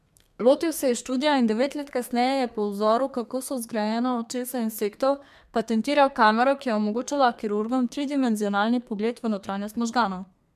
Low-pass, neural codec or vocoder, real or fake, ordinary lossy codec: 14.4 kHz; codec, 32 kHz, 1.9 kbps, SNAC; fake; MP3, 96 kbps